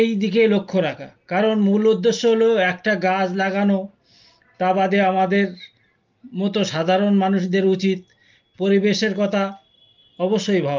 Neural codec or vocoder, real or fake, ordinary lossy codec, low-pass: none; real; Opus, 32 kbps; 7.2 kHz